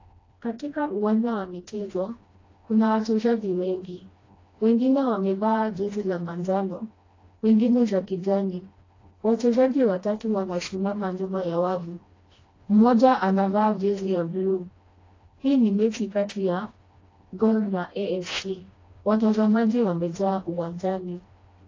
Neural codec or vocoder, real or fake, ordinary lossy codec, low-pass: codec, 16 kHz, 1 kbps, FreqCodec, smaller model; fake; AAC, 32 kbps; 7.2 kHz